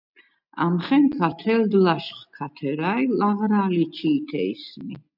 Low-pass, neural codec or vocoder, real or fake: 5.4 kHz; none; real